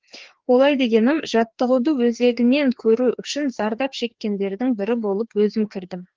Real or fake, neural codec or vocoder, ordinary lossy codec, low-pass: fake; codec, 16 kHz, 2 kbps, FreqCodec, larger model; Opus, 16 kbps; 7.2 kHz